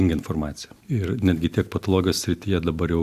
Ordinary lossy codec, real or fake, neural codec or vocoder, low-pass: Opus, 64 kbps; real; none; 14.4 kHz